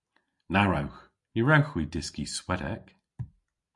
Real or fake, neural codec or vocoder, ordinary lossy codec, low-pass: real; none; MP3, 64 kbps; 10.8 kHz